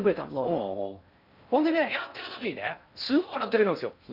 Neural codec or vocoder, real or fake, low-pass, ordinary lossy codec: codec, 16 kHz in and 24 kHz out, 0.6 kbps, FocalCodec, streaming, 4096 codes; fake; 5.4 kHz; none